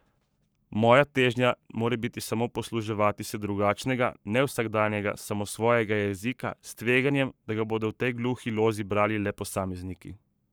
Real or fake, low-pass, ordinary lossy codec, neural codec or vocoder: fake; none; none; codec, 44.1 kHz, 7.8 kbps, Pupu-Codec